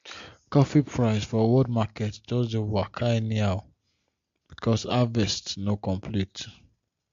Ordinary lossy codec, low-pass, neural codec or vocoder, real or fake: MP3, 48 kbps; 7.2 kHz; none; real